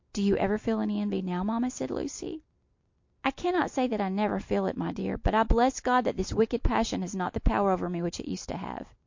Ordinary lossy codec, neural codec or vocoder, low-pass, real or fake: MP3, 48 kbps; none; 7.2 kHz; real